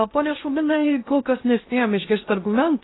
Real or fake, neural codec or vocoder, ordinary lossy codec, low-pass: fake; codec, 16 kHz in and 24 kHz out, 0.6 kbps, FocalCodec, streaming, 2048 codes; AAC, 16 kbps; 7.2 kHz